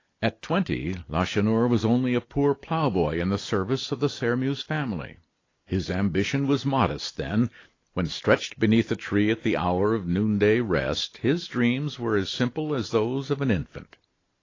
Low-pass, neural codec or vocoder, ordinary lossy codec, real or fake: 7.2 kHz; none; AAC, 32 kbps; real